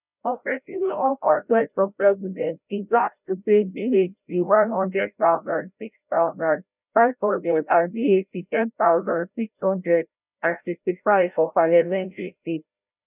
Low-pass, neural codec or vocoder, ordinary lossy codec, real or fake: 3.6 kHz; codec, 16 kHz, 0.5 kbps, FreqCodec, larger model; none; fake